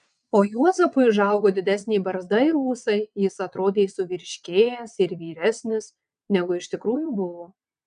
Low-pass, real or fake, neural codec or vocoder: 9.9 kHz; fake; vocoder, 22.05 kHz, 80 mel bands, WaveNeXt